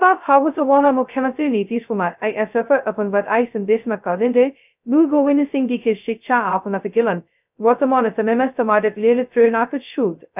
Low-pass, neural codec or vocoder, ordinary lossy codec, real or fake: 3.6 kHz; codec, 16 kHz, 0.2 kbps, FocalCodec; none; fake